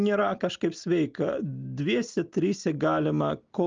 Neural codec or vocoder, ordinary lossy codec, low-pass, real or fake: none; Opus, 24 kbps; 7.2 kHz; real